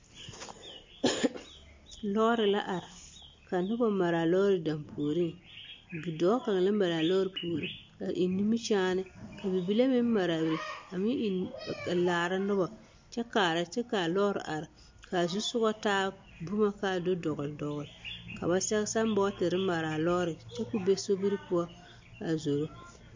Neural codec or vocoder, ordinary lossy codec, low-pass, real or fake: none; MP3, 48 kbps; 7.2 kHz; real